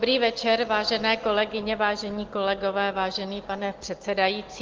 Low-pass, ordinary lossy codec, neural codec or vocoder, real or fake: 7.2 kHz; Opus, 16 kbps; none; real